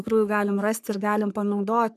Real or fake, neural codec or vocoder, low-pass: fake; codec, 44.1 kHz, 3.4 kbps, Pupu-Codec; 14.4 kHz